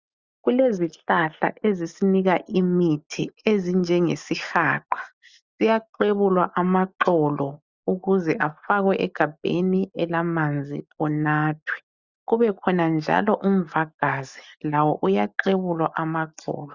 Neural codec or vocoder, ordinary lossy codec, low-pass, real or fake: none; Opus, 64 kbps; 7.2 kHz; real